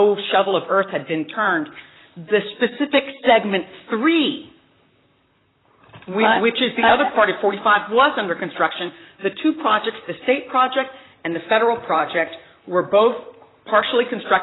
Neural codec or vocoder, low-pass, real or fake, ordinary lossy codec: vocoder, 44.1 kHz, 128 mel bands, Pupu-Vocoder; 7.2 kHz; fake; AAC, 16 kbps